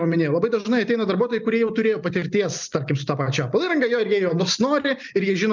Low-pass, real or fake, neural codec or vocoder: 7.2 kHz; real; none